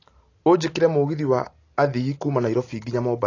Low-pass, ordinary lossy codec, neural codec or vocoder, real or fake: 7.2 kHz; AAC, 32 kbps; none; real